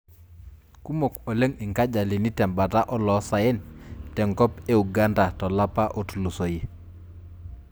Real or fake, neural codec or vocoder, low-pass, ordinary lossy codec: real; none; none; none